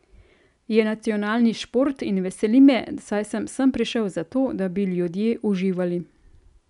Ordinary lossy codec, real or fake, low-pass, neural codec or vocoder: none; real; 10.8 kHz; none